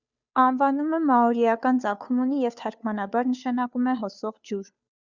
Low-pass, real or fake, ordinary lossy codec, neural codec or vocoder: 7.2 kHz; fake; Opus, 64 kbps; codec, 16 kHz, 2 kbps, FunCodec, trained on Chinese and English, 25 frames a second